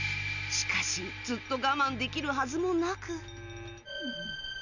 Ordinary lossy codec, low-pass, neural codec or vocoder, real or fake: none; 7.2 kHz; none; real